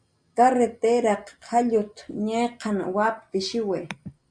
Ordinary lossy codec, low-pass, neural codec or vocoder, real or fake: Opus, 64 kbps; 9.9 kHz; none; real